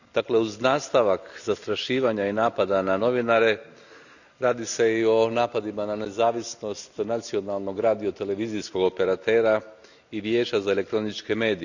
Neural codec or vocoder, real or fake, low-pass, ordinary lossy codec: none; real; 7.2 kHz; none